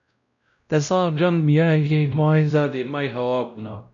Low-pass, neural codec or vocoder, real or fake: 7.2 kHz; codec, 16 kHz, 0.5 kbps, X-Codec, WavLM features, trained on Multilingual LibriSpeech; fake